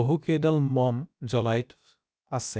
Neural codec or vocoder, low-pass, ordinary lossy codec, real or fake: codec, 16 kHz, about 1 kbps, DyCAST, with the encoder's durations; none; none; fake